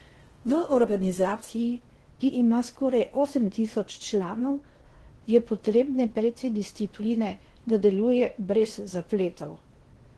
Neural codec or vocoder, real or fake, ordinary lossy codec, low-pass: codec, 16 kHz in and 24 kHz out, 0.8 kbps, FocalCodec, streaming, 65536 codes; fake; Opus, 16 kbps; 10.8 kHz